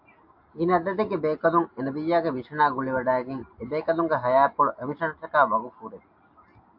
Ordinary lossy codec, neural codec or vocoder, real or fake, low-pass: AAC, 48 kbps; none; real; 5.4 kHz